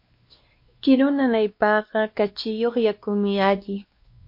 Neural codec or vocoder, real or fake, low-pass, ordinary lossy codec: codec, 16 kHz, 2 kbps, X-Codec, WavLM features, trained on Multilingual LibriSpeech; fake; 5.4 kHz; MP3, 32 kbps